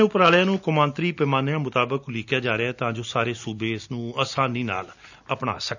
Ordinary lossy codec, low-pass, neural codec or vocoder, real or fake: none; 7.2 kHz; none; real